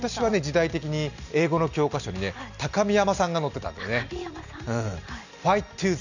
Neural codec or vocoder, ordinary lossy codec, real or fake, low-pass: none; none; real; 7.2 kHz